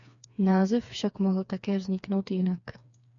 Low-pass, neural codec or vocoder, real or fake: 7.2 kHz; codec, 16 kHz, 4 kbps, FreqCodec, smaller model; fake